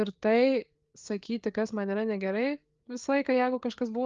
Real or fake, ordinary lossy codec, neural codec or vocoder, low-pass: fake; Opus, 32 kbps; codec, 16 kHz, 4 kbps, FreqCodec, larger model; 7.2 kHz